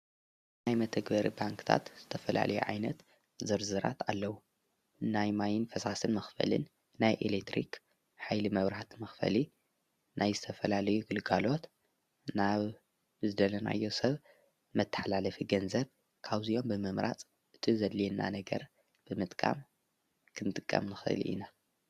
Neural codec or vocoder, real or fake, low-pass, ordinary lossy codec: none; real; 14.4 kHz; Opus, 64 kbps